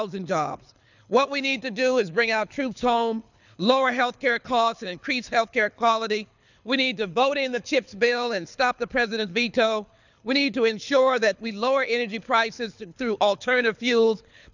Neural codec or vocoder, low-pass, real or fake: codec, 24 kHz, 6 kbps, HILCodec; 7.2 kHz; fake